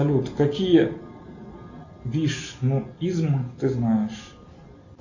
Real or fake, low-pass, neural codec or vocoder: real; 7.2 kHz; none